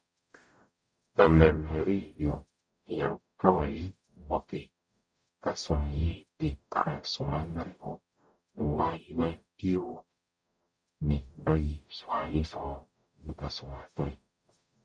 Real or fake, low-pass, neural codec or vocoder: fake; 9.9 kHz; codec, 44.1 kHz, 0.9 kbps, DAC